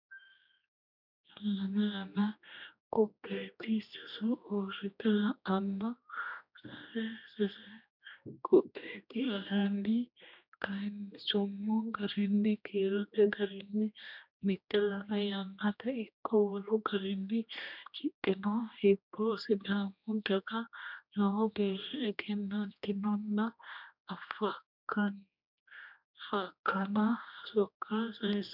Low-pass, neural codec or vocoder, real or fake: 5.4 kHz; codec, 16 kHz, 1 kbps, X-Codec, HuBERT features, trained on general audio; fake